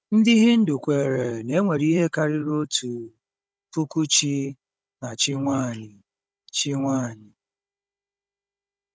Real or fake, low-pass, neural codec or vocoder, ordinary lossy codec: fake; none; codec, 16 kHz, 16 kbps, FunCodec, trained on Chinese and English, 50 frames a second; none